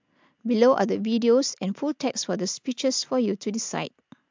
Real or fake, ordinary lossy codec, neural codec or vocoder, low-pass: real; MP3, 64 kbps; none; 7.2 kHz